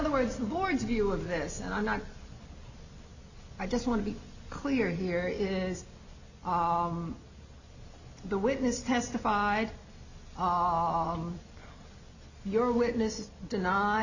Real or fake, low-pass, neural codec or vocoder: real; 7.2 kHz; none